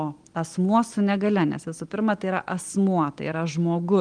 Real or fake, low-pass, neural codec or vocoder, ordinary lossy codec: real; 9.9 kHz; none; Opus, 24 kbps